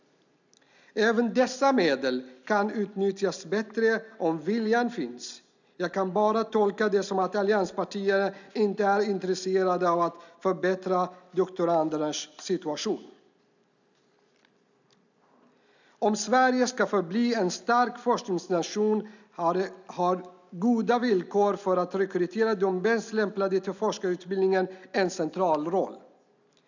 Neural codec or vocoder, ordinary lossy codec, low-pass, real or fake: none; none; 7.2 kHz; real